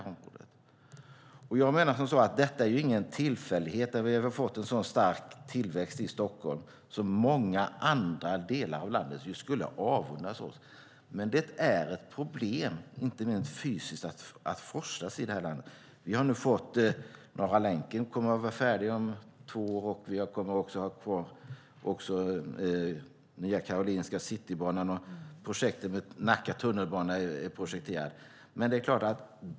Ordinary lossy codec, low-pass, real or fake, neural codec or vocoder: none; none; real; none